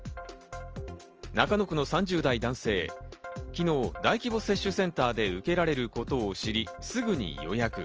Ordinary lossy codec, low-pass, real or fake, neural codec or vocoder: Opus, 24 kbps; 7.2 kHz; real; none